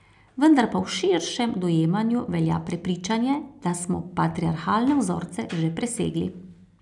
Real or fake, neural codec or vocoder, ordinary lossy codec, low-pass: real; none; none; 10.8 kHz